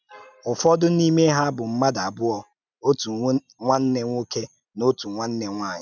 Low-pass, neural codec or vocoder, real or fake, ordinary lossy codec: 7.2 kHz; none; real; none